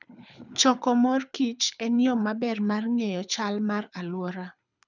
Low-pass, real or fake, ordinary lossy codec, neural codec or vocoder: 7.2 kHz; fake; none; codec, 24 kHz, 6 kbps, HILCodec